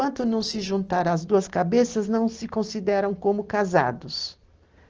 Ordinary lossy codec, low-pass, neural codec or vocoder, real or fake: Opus, 16 kbps; 7.2 kHz; none; real